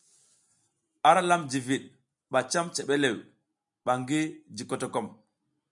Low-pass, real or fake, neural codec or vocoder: 10.8 kHz; real; none